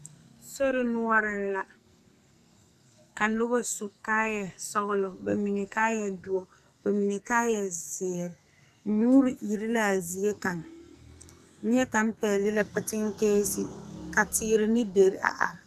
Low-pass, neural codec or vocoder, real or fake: 14.4 kHz; codec, 44.1 kHz, 2.6 kbps, SNAC; fake